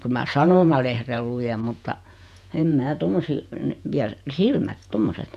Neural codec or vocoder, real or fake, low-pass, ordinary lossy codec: vocoder, 48 kHz, 128 mel bands, Vocos; fake; 14.4 kHz; none